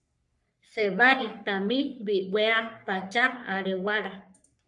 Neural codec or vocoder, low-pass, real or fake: codec, 44.1 kHz, 3.4 kbps, Pupu-Codec; 10.8 kHz; fake